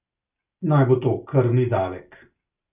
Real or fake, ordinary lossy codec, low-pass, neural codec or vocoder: real; none; 3.6 kHz; none